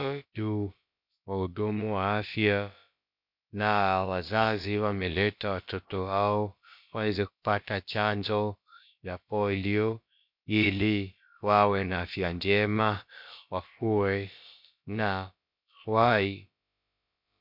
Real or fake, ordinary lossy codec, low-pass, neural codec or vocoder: fake; MP3, 48 kbps; 5.4 kHz; codec, 16 kHz, about 1 kbps, DyCAST, with the encoder's durations